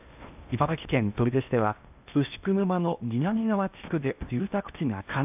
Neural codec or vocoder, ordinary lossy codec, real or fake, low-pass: codec, 16 kHz in and 24 kHz out, 0.8 kbps, FocalCodec, streaming, 65536 codes; AAC, 32 kbps; fake; 3.6 kHz